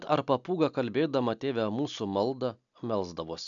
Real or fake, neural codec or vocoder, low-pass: real; none; 7.2 kHz